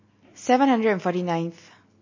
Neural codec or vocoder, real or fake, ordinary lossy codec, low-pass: none; real; MP3, 32 kbps; 7.2 kHz